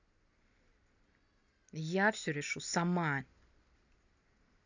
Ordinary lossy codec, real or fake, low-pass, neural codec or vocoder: none; real; 7.2 kHz; none